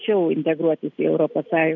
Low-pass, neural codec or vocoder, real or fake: 7.2 kHz; none; real